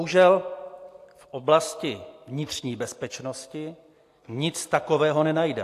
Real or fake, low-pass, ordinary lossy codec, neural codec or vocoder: real; 14.4 kHz; AAC, 64 kbps; none